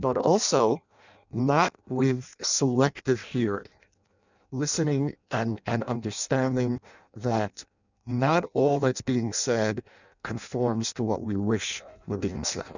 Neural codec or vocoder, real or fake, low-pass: codec, 16 kHz in and 24 kHz out, 0.6 kbps, FireRedTTS-2 codec; fake; 7.2 kHz